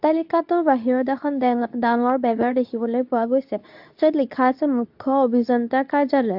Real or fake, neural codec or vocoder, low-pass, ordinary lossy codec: fake; codec, 24 kHz, 0.9 kbps, WavTokenizer, medium speech release version 2; 5.4 kHz; AAC, 48 kbps